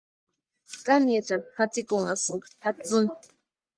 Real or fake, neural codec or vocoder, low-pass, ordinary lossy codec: fake; codec, 44.1 kHz, 1.7 kbps, Pupu-Codec; 9.9 kHz; Opus, 64 kbps